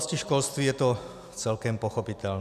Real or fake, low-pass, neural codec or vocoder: real; 14.4 kHz; none